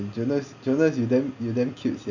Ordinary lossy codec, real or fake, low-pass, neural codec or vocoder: none; real; 7.2 kHz; none